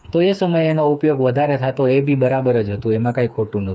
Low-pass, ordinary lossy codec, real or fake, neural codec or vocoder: none; none; fake; codec, 16 kHz, 4 kbps, FreqCodec, smaller model